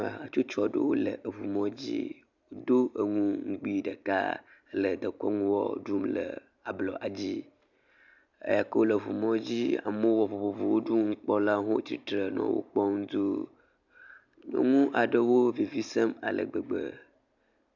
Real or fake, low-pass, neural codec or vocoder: real; 7.2 kHz; none